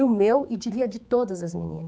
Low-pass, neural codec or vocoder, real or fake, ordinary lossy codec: none; codec, 16 kHz, 4 kbps, X-Codec, HuBERT features, trained on general audio; fake; none